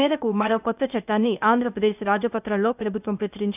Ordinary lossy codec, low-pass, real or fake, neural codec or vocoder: none; 3.6 kHz; fake; codec, 16 kHz, 0.8 kbps, ZipCodec